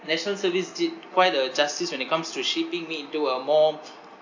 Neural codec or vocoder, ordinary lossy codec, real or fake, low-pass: none; AAC, 48 kbps; real; 7.2 kHz